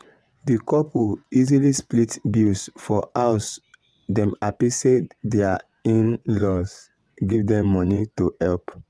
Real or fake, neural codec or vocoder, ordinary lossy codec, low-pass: fake; vocoder, 22.05 kHz, 80 mel bands, WaveNeXt; none; none